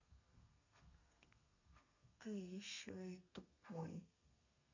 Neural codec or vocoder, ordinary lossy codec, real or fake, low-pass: codec, 32 kHz, 1.9 kbps, SNAC; none; fake; 7.2 kHz